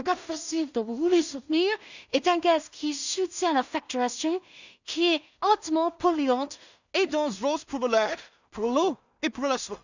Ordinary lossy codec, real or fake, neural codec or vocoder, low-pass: none; fake; codec, 16 kHz in and 24 kHz out, 0.4 kbps, LongCat-Audio-Codec, two codebook decoder; 7.2 kHz